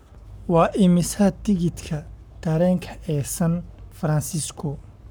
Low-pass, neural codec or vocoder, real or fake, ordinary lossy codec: none; codec, 44.1 kHz, 7.8 kbps, Pupu-Codec; fake; none